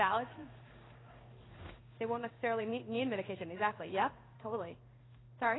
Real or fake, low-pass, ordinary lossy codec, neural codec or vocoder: fake; 7.2 kHz; AAC, 16 kbps; codec, 16 kHz in and 24 kHz out, 1 kbps, XY-Tokenizer